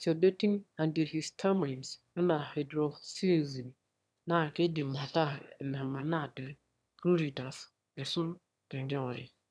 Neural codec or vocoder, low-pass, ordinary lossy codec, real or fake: autoencoder, 22.05 kHz, a latent of 192 numbers a frame, VITS, trained on one speaker; none; none; fake